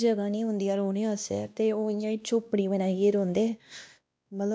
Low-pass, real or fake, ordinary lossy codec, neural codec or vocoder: none; fake; none; codec, 16 kHz, 1 kbps, X-Codec, WavLM features, trained on Multilingual LibriSpeech